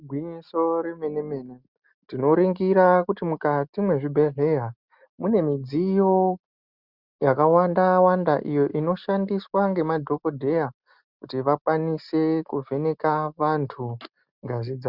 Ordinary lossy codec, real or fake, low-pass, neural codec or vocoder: Opus, 64 kbps; real; 5.4 kHz; none